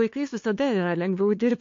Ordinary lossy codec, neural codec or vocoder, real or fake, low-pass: AAC, 48 kbps; codec, 16 kHz, 2 kbps, FunCodec, trained on LibriTTS, 25 frames a second; fake; 7.2 kHz